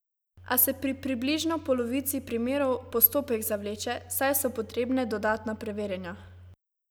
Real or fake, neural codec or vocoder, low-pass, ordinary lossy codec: real; none; none; none